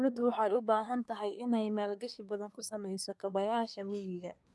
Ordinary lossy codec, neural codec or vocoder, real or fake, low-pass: none; codec, 24 kHz, 1 kbps, SNAC; fake; none